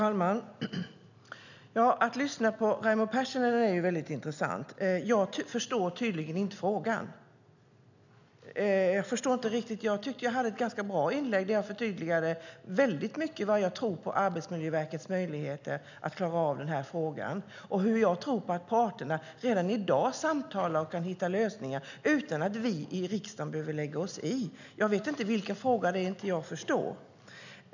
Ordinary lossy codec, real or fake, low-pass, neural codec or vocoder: none; real; 7.2 kHz; none